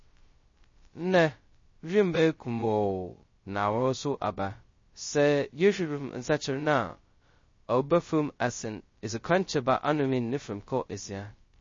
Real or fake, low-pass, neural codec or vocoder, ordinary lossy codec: fake; 7.2 kHz; codec, 16 kHz, 0.2 kbps, FocalCodec; MP3, 32 kbps